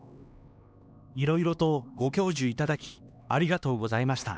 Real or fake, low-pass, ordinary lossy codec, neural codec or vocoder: fake; none; none; codec, 16 kHz, 2 kbps, X-Codec, HuBERT features, trained on LibriSpeech